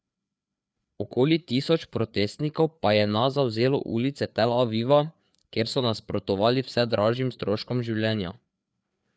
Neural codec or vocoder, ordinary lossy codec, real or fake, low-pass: codec, 16 kHz, 4 kbps, FreqCodec, larger model; none; fake; none